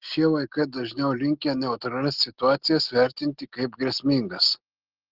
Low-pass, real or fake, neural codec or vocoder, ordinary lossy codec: 5.4 kHz; real; none; Opus, 16 kbps